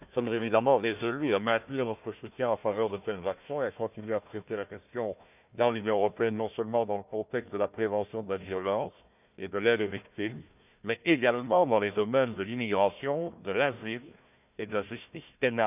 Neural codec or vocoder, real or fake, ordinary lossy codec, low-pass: codec, 16 kHz, 1 kbps, FunCodec, trained on Chinese and English, 50 frames a second; fake; none; 3.6 kHz